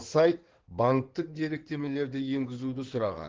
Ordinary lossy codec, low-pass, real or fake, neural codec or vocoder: Opus, 16 kbps; 7.2 kHz; fake; codec, 16 kHz in and 24 kHz out, 2.2 kbps, FireRedTTS-2 codec